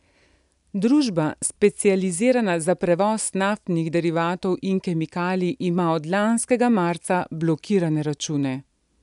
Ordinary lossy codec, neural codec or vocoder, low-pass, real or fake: none; none; 10.8 kHz; real